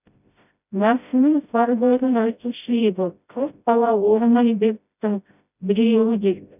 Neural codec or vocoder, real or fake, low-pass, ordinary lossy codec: codec, 16 kHz, 0.5 kbps, FreqCodec, smaller model; fake; 3.6 kHz; none